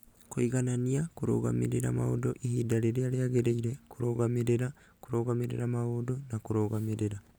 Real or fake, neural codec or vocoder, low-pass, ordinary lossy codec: real; none; none; none